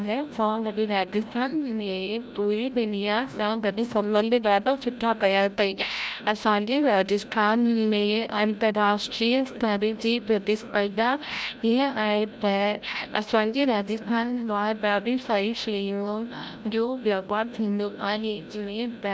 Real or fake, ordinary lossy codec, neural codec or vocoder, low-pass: fake; none; codec, 16 kHz, 0.5 kbps, FreqCodec, larger model; none